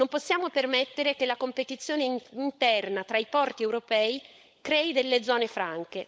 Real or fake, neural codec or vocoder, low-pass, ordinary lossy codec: fake; codec, 16 kHz, 16 kbps, FunCodec, trained on LibriTTS, 50 frames a second; none; none